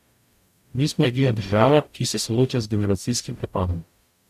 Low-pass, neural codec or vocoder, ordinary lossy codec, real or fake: 14.4 kHz; codec, 44.1 kHz, 0.9 kbps, DAC; none; fake